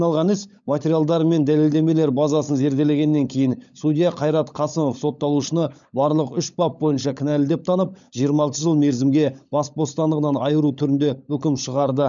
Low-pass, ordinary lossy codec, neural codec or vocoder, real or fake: 7.2 kHz; none; codec, 16 kHz, 16 kbps, FunCodec, trained on LibriTTS, 50 frames a second; fake